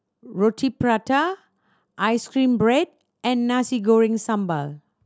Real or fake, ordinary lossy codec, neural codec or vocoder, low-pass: real; none; none; none